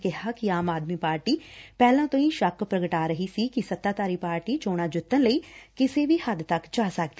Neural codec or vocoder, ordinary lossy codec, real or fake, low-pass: none; none; real; none